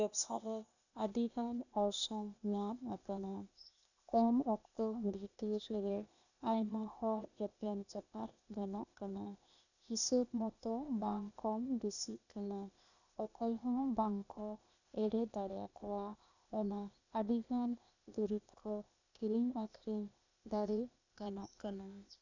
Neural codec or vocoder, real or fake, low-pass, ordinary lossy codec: codec, 16 kHz, 0.8 kbps, ZipCodec; fake; 7.2 kHz; none